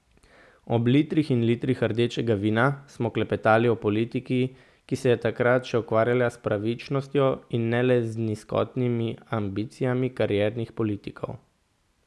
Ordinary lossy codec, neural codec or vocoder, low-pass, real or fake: none; none; none; real